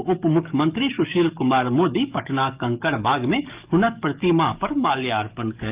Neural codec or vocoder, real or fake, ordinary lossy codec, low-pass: codec, 44.1 kHz, 7.8 kbps, DAC; fake; Opus, 24 kbps; 3.6 kHz